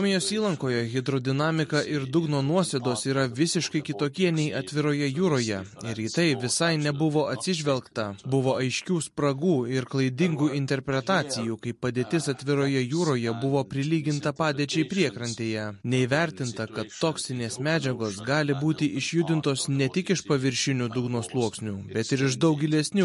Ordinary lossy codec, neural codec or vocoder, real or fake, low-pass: MP3, 48 kbps; none; real; 14.4 kHz